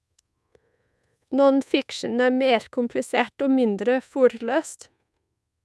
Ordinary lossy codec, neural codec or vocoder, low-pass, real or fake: none; codec, 24 kHz, 1.2 kbps, DualCodec; none; fake